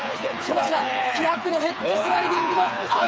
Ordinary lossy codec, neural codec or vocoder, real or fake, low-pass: none; codec, 16 kHz, 4 kbps, FreqCodec, smaller model; fake; none